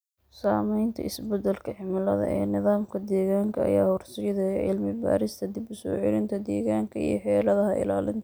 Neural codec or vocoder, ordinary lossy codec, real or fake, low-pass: none; none; real; none